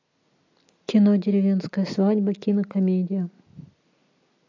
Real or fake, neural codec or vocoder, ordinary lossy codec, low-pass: real; none; AAC, 48 kbps; 7.2 kHz